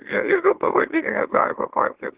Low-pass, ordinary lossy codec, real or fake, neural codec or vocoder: 3.6 kHz; Opus, 16 kbps; fake; autoencoder, 44.1 kHz, a latent of 192 numbers a frame, MeloTTS